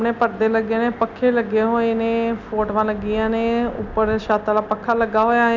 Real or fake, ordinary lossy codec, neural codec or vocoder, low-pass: real; none; none; 7.2 kHz